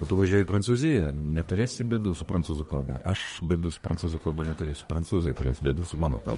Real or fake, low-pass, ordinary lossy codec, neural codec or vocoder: fake; 10.8 kHz; MP3, 48 kbps; codec, 24 kHz, 1 kbps, SNAC